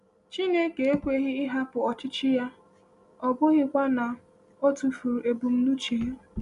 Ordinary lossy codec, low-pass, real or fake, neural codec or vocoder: none; 10.8 kHz; real; none